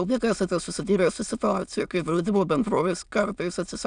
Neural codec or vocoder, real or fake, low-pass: autoencoder, 22.05 kHz, a latent of 192 numbers a frame, VITS, trained on many speakers; fake; 9.9 kHz